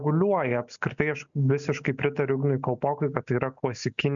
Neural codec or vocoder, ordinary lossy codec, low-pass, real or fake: none; MP3, 96 kbps; 7.2 kHz; real